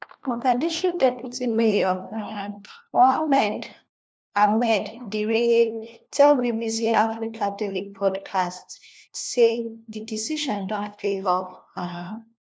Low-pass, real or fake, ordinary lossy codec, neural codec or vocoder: none; fake; none; codec, 16 kHz, 1 kbps, FunCodec, trained on LibriTTS, 50 frames a second